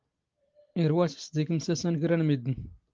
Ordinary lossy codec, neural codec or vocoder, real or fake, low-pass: Opus, 24 kbps; none; real; 7.2 kHz